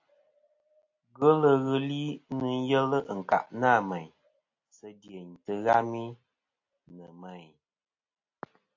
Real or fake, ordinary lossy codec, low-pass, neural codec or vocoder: real; AAC, 48 kbps; 7.2 kHz; none